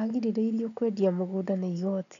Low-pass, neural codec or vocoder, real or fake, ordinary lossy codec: 7.2 kHz; none; real; none